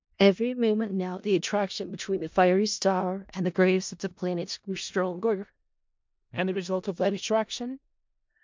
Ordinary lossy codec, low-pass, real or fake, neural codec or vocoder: MP3, 64 kbps; 7.2 kHz; fake; codec, 16 kHz in and 24 kHz out, 0.4 kbps, LongCat-Audio-Codec, four codebook decoder